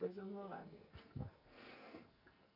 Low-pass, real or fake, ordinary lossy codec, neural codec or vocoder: 5.4 kHz; fake; AAC, 32 kbps; codec, 44.1 kHz, 2.6 kbps, SNAC